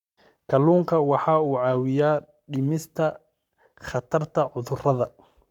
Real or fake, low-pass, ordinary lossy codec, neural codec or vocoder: fake; 19.8 kHz; none; codec, 44.1 kHz, 7.8 kbps, Pupu-Codec